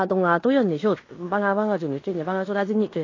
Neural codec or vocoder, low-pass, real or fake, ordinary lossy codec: codec, 16 kHz in and 24 kHz out, 0.9 kbps, LongCat-Audio-Codec, fine tuned four codebook decoder; 7.2 kHz; fake; AAC, 32 kbps